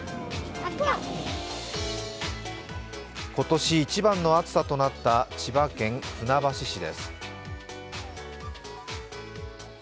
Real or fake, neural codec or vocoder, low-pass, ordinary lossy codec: real; none; none; none